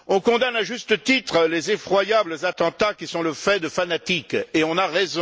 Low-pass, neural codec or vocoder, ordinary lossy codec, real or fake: none; none; none; real